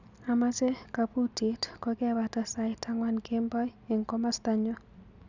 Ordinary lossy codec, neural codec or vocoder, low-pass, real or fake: none; none; 7.2 kHz; real